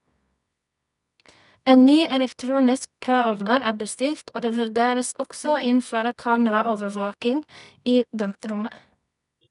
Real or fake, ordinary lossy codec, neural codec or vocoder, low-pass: fake; none; codec, 24 kHz, 0.9 kbps, WavTokenizer, medium music audio release; 10.8 kHz